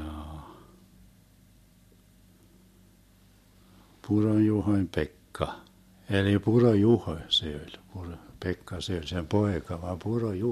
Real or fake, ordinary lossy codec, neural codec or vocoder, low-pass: real; MP3, 64 kbps; none; 14.4 kHz